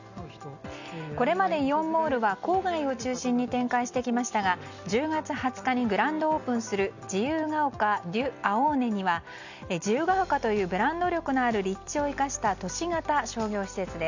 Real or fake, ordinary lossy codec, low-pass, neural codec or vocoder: real; none; 7.2 kHz; none